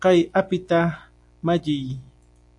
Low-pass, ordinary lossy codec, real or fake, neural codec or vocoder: 10.8 kHz; AAC, 64 kbps; real; none